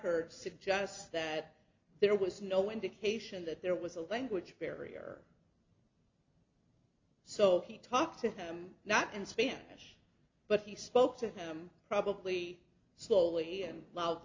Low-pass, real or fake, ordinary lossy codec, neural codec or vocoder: 7.2 kHz; real; MP3, 64 kbps; none